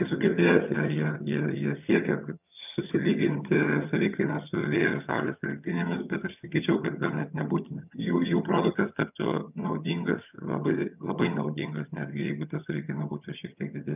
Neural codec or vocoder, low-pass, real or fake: vocoder, 22.05 kHz, 80 mel bands, HiFi-GAN; 3.6 kHz; fake